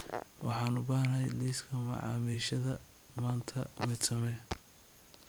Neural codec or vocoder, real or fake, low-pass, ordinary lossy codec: vocoder, 44.1 kHz, 128 mel bands every 512 samples, BigVGAN v2; fake; none; none